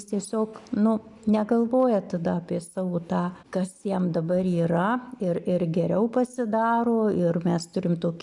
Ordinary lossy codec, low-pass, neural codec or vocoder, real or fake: MP3, 96 kbps; 10.8 kHz; none; real